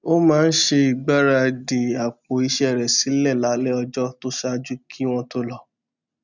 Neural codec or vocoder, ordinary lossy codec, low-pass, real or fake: none; none; 7.2 kHz; real